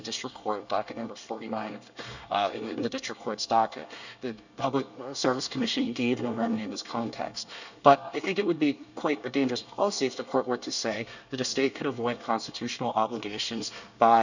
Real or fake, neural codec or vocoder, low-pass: fake; codec, 24 kHz, 1 kbps, SNAC; 7.2 kHz